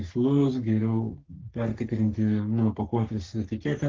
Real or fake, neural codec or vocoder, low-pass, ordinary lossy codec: fake; codec, 44.1 kHz, 3.4 kbps, Pupu-Codec; 7.2 kHz; Opus, 16 kbps